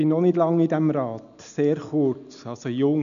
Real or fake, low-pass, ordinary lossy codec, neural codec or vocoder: real; 7.2 kHz; none; none